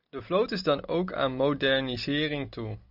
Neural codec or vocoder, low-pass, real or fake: none; 5.4 kHz; real